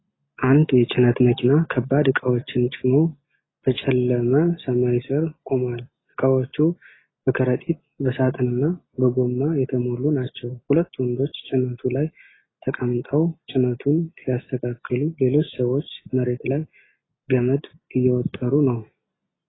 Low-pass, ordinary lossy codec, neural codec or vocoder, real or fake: 7.2 kHz; AAC, 16 kbps; none; real